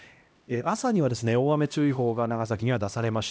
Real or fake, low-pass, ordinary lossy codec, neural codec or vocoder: fake; none; none; codec, 16 kHz, 1 kbps, X-Codec, HuBERT features, trained on LibriSpeech